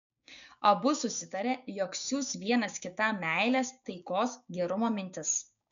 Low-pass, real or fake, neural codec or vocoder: 7.2 kHz; fake; codec, 16 kHz, 6 kbps, DAC